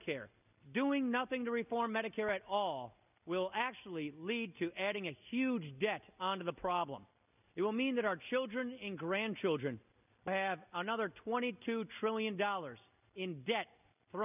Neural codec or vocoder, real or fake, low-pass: none; real; 3.6 kHz